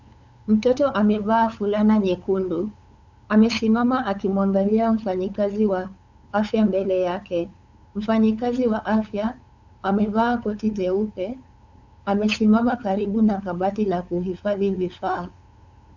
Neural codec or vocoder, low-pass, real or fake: codec, 16 kHz, 8 kbps, FunCodec, trained on LibriTTS, 25 frames a second; 7.2 kHz; fake